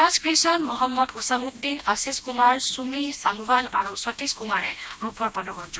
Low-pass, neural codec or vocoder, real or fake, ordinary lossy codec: none; codec, 16 kHz, 1 kbps, FreqCodec, smaller model; fake; none